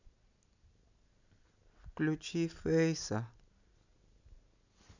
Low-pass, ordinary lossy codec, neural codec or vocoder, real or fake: 7.2 kHz; none; none; real